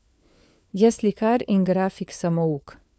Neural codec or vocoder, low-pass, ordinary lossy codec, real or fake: codec, 16 kHz, 4 kbps, FunCodec, trained on LibriTTS, 50 frames a second; none; none; fake